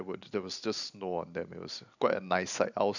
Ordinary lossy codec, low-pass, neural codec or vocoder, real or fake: none; 7.2 kHz; none; real